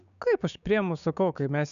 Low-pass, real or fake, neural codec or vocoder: 7.2 kHz; fake; codec, 16 kHz, 6 kbps, DAC